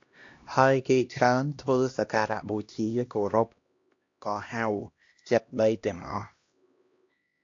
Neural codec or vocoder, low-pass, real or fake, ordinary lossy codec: codec, 16 kHz, 1 kbps, X-Codec, HuBERT features, trained on LibriSpeech; 7.2 kHz; fake; AAC, 48 kbps